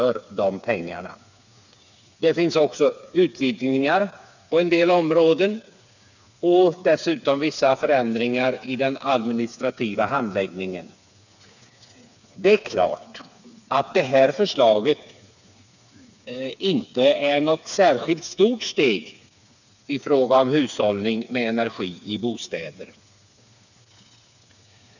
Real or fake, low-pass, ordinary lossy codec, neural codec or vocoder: fake; 7.2 kHz; none; codec, 16 kHz, 4 kbps, FreqCodec, smaller model